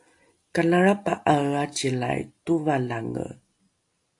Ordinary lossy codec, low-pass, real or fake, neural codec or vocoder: AAC, 64 kbps; 10.8 kHz; real; none